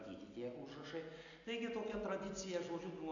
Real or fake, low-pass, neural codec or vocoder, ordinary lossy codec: real; 7.2 kHz; none; AAC, 64 kbps